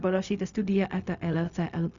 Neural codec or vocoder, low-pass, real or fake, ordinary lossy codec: codec, 16 kHz, 0.4 kbps, LongCat-Audio-Codec; 7.2 kHz; fake; Opus, 64 kbps